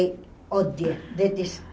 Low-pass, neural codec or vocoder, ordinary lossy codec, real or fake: none; none; none; real